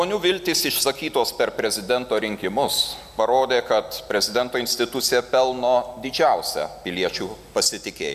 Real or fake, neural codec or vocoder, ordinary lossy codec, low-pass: real; none; MP3, 96 kbps; 14.4 kHz